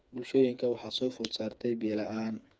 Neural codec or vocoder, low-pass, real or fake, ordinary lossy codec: codec, 16 kHz, 4 kbps, FreqCodec, smaller model; none; fake; none